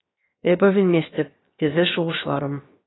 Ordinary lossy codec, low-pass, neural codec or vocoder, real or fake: AAC, 16 kbps; 7.2 kHz; codec, 16 kHz, 0.7 kbps, FocalCodec; fake